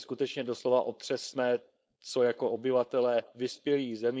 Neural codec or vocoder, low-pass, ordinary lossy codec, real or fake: codec, 16 kHz, 4.8 kbps, FACodec; none; none; fake